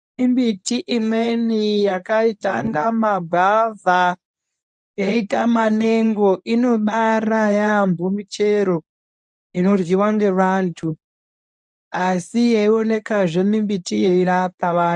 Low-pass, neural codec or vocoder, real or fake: 10.8 kHz; codec, 24 kHz, 0.9 kbps, WavTokenizer, medium speech release version 1; fake